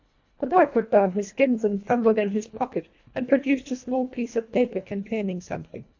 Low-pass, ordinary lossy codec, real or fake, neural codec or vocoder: 7.2 kHz; AAC, 48 kbps; fake; codec, 24 kHz, 1.5 kbps, HILCodec